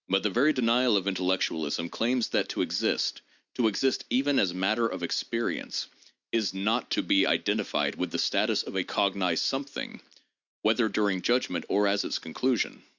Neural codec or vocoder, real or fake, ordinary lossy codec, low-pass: none; real; Opus, 64 kbps; 7.2 kHz